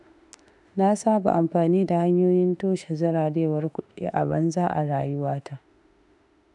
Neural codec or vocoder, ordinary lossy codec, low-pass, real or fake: autoencoder, 48 kHz, 32 numbers a frame, DAC-VAE, trained on Japanese speech; none; 10.8 kHz; fake